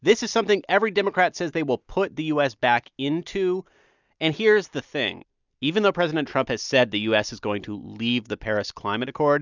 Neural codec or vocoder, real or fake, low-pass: none; real; 7.2 kHz